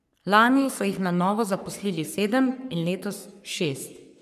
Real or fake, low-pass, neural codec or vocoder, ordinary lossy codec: fake; 14.4 kHz; codec, 44.1 kHz, 3.4 kbps, Pupu-Codec; none